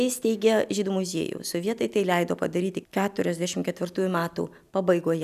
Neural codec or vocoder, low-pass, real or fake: none; 14.4 kHz; real